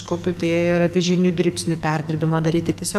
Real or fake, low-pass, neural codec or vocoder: fake; 14.4 kHz; codec, 44.1 kHz, 2.6 kbps, SNAC